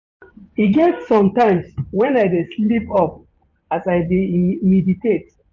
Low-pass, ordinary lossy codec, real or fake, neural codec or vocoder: 7.2 kHz; none; fake; vocoder, 24 kHz, 100 mel bands, Vocos